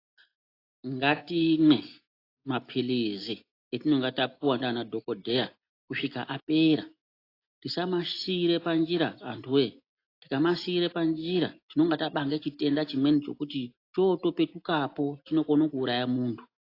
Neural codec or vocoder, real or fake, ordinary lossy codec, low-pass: none; real; AAC, 32 kbps; 5.4 kHz